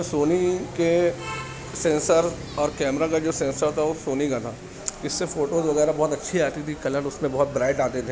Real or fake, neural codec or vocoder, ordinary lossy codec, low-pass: real; none; none; none